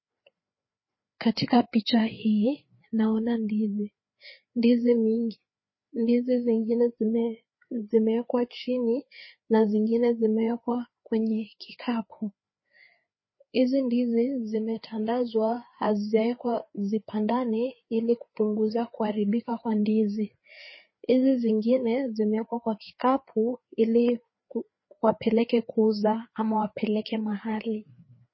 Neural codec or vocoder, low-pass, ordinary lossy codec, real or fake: codec, 16 kHz, 4 kbps, FreqCodec, larger model; 7.2 kHz; MP3, 24 kbps; fake